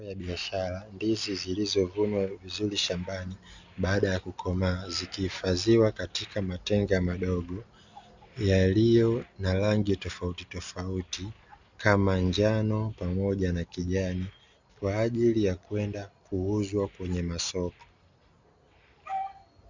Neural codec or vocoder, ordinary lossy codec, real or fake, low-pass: none; Opus, 64 kbps; real; 7.2 kHz